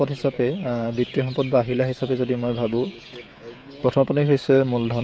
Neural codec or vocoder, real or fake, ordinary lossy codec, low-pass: codec, 16 kHz, 16 kbps, FreqCodec, smaller model; fake; none; none